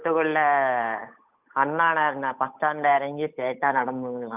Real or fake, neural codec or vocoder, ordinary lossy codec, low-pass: fake; codec, 16 kHz, 8 kbps, FunCodec, trained on Chinese and English, 25 frames a second; none; 3.6 kHz